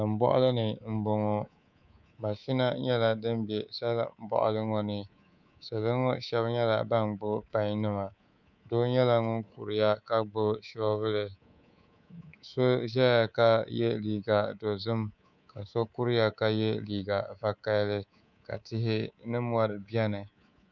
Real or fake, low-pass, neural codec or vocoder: fake; 7.2 kHz; codec, 24 kHz, 3.1 kbps, DualCodec